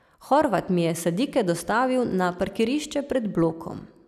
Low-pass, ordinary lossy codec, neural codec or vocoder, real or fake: 14.4 kHz; none; none; real